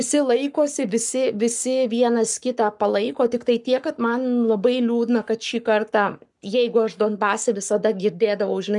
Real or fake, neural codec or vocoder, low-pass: fake; codec, 44.1 kHz, 7.8 kbps, Pupu-Codec; 10.8 kHz